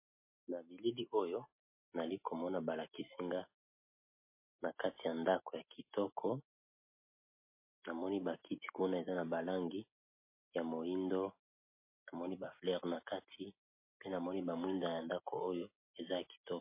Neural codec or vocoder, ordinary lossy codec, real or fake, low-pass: none; MP3, 24 kbps; real; 3.6 kHz